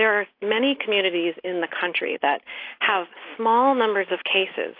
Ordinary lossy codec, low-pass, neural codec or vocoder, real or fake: AAC, 32 kbps; 5.4 kHz; none; real